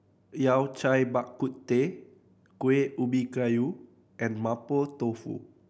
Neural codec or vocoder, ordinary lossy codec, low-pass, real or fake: none; none; none; real